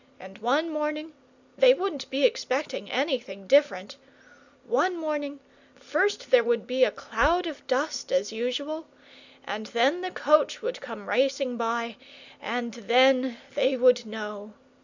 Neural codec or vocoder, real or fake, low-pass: none; real; 7.2 kHz